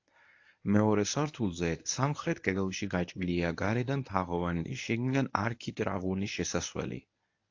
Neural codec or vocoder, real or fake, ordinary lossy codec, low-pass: codec, 24 kHz, 0.9 kbps, WavTokenizer, medium speech release version 1; fake; AAC, 48 kbps; 7.2 kHz